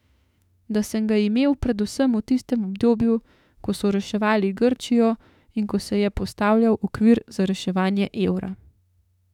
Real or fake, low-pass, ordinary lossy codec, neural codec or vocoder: fake; 19.8 kHz; none; autoencoder, 48 kHz, 32 numbers a frame, DAC-VAE, trained on Japanese speech